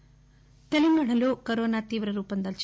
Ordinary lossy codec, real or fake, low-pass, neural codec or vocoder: none; real; none; none